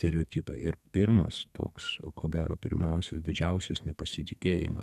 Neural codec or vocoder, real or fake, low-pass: codec, 32 kHz, 1.9 kbps, SNAC; fake; 14.4 kHz